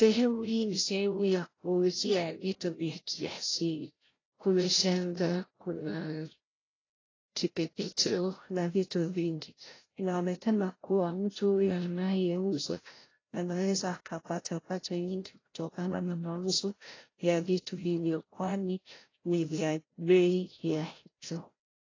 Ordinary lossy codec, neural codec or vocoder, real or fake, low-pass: AAC, 32 kbps; codec, 16 kHz, 0.5 kbps, FreqCodec, larger model; fake; 7.2 kHz